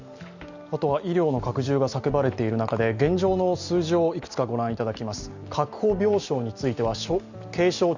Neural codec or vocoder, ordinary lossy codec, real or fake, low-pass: none; Opus, 64 kbps; real; 7.2 kHz